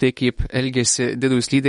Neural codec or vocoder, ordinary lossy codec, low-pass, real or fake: autoencoder, 48 kHz, 128 numbers a frame, DAC-VAE, trained on Japanese speech; MP3, 48 kbps; 19.8 kHz; fake